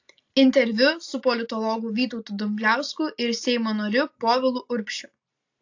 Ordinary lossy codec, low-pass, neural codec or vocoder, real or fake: AAC, 48 kbps; 7.2 kHz; none; real